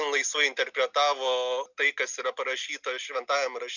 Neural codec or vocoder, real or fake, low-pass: none; real; 7.2 kHz